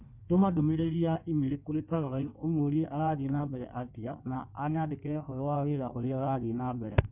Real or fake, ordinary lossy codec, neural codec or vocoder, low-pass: fake; none; codec, 16 kHz in and 24 kHz out, 1.1 kbps, FireRedTTS-2 codec; 3.6 kHz